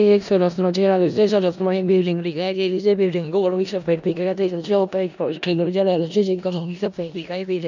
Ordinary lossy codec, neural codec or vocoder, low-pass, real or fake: none; codec, 16 kHz in and 24 kHz out, 0.4 kbps, LongCat-Audio-Codec, four codebook decoder; 7.2 kHz; fake